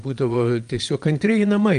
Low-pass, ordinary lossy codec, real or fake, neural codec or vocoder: 9.9 kHz; Opus, 32 kbps; fake; vocoder, 22.05 kHz, 80 mel bands, Vocos